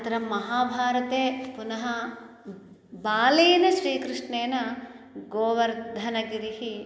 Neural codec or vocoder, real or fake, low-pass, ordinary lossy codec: none; real; none; none